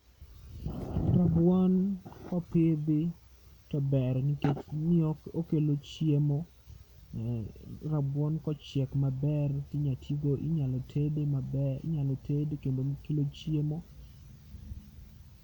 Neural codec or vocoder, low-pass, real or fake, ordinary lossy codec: none; 19.8 kHz; real; none